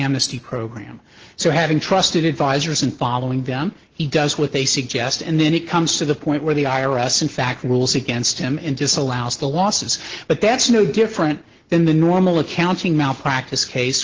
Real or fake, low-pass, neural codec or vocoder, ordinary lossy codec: real; 7.2 kHz; none; Opus, 16 kbps